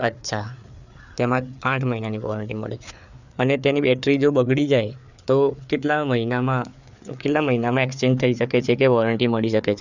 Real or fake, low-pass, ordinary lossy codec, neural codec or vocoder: fake; 7.2 kHz; none; codec, 16 kHz, 4 kbps, FreqCodec, larger model